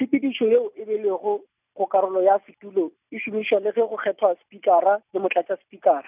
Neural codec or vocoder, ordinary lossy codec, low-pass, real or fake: none; none; 3.6 kHz; real